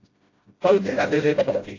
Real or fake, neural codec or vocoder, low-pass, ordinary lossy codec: fake; codec, 16 kHz, 0.5 kbps, FreqCodec, smaller model; 7.2 kHz; AAC, 32 kbps